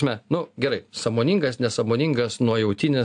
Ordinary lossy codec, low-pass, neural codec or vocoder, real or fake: AAC, 64 kbps; 9.9 kHz; none; real